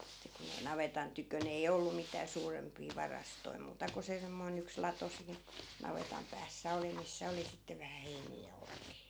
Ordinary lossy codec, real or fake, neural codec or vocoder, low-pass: none; real; none; none